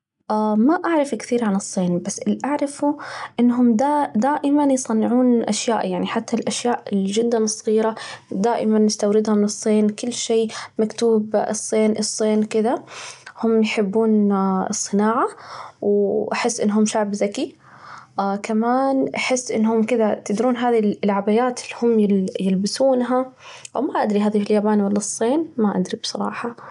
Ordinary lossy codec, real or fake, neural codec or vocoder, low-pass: none; real; none; 10.8 kHz